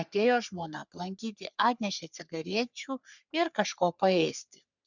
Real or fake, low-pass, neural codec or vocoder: fake; 7.2 kHz; codec, 44.1 kHz, 3.4 kbps, Pupu-Codec